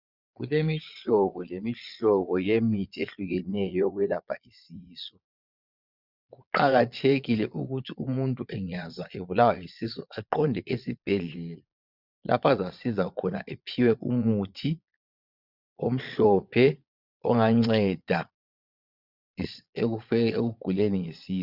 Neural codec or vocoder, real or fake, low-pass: vocoder, 22.05 kHz, 80 mel bands, Vocos; fake; 5.4 kHz